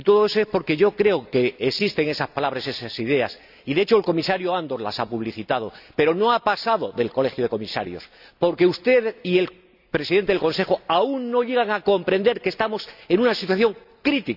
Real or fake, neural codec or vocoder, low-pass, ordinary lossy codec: real; none; 5.4 kHz; none